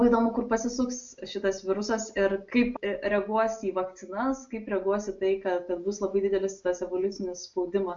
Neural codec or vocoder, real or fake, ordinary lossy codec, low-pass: none; real; AAC, 64 kbps; 7.2 kHz